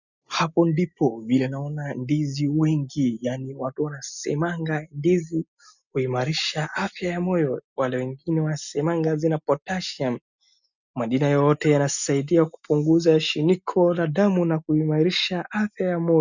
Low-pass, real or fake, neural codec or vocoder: 7.2 kHz; real; none